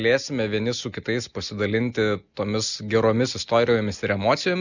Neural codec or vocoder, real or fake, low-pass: none; real; 7.2 kHz